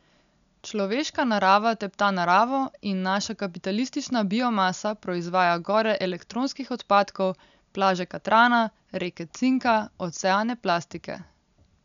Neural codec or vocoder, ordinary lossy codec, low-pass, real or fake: none; none; 7.2 kHz; real